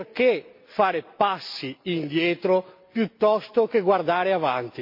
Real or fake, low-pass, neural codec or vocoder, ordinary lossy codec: real; 5.4 kHz; none; MP3, 32 kbps